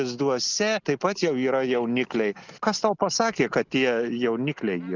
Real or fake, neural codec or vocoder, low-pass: real; none; 7.2 kHz